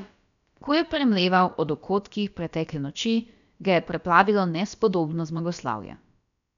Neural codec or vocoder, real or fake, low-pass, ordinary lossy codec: codec, 16 kHz, about 1 kbps, DyCAST, with the encoder's durations; fake; 7.2 kHz; none